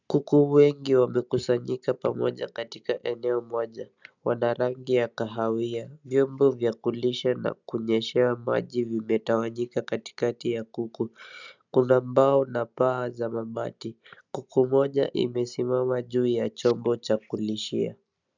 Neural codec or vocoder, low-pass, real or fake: none; 7.2 kHz; real